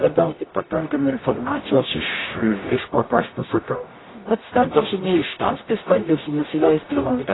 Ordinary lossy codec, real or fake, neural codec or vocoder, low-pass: AAC, 16 kbps; fake; codec, 44.1 kHz, 0.9 kbps, DAC; 7.2 kHz